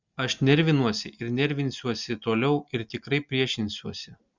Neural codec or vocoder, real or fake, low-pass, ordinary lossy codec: none; real; 7.2 kHz; Opus, 64 kbps